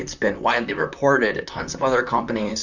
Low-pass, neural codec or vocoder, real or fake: 7.2 kHz; vocoder, 44.1 kHz, 128 mel bands, Pupu-Vocoder; fake